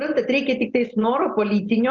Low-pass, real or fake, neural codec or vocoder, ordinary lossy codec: 7.2 kHz; real; none; Opus, 32 kbps